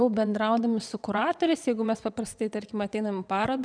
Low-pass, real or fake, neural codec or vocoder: 9.9 kHz; fake; vocoder, 22.05 kHz, 80 mel bands, WaveNeXt